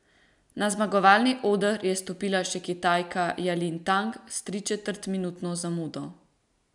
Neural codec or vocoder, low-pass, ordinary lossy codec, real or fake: none; 10.8 kHz; none; real